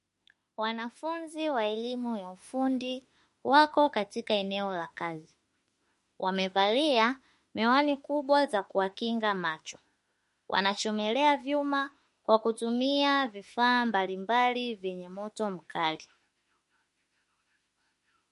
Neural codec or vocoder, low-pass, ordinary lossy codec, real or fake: autoencoder, 48 kHz, 32 numbers a frame, DAC-VAE, trained on Japanese speech; 14.4 kHz; MP3, 48 kbps; fake